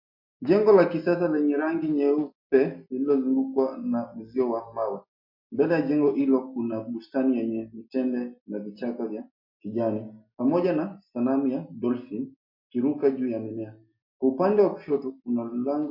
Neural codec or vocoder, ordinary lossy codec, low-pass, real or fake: none; MP3, 32 kbps; 5.4 kHz; real